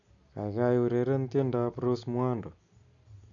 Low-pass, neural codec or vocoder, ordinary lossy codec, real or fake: 7.2 kHz; none; none; real